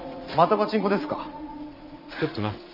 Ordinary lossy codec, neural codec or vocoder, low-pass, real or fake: none; vocoder, 44.1 kHz, 80 mel bands, Vocos; 5.4 kHz; fake